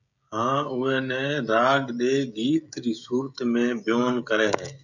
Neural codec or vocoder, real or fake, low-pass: codec, 16 kHz, 16 kbps, FreqCodec, smaller model; fake; 7.2 kHz